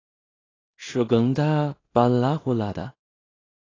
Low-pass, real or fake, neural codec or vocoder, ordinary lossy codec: 7.2 kHz; fake; codec, 16 kHz in and 24 kHz out, 0.4 kbps, LongCat-Audio-Codec, two codebook decoder; AAC, 32 kbps